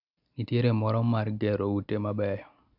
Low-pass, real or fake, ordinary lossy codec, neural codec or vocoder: 5.4 kHz; real; none; none